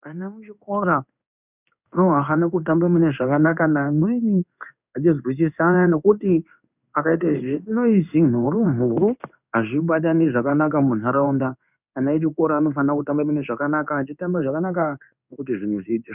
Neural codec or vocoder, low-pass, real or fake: codec, 16 kHz in and 24 kHz out, 1 kbps, XY-Tokenizer; 3.6 kHz; fake